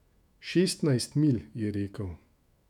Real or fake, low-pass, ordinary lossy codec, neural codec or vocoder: fake; 19.8 kHz; none; autoencoder, 48 kHz, 128 numbers a frame, DAC-VAE, trained on Japanese speech